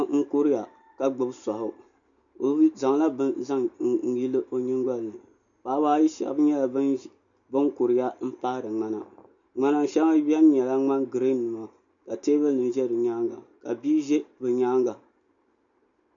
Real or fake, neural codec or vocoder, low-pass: real; none; 7.2 kHz